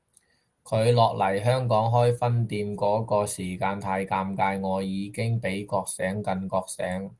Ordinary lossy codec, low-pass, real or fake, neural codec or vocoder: Opus, 24 kbps; 10.8 kHz; real; none